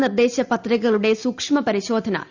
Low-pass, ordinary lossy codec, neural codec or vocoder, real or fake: 7.2 kHz; Opus, 64 kbps; none; real